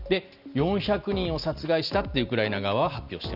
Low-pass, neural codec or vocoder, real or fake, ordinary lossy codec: 5.4 kHz; none; real; Opus, 64 kbps